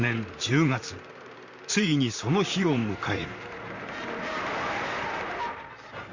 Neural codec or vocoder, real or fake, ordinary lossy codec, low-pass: vocoder, 44.1 kHz, 128 mel bands, Pupu-Vocoder; fake; Opus, 64 kbps; 7.2 kHz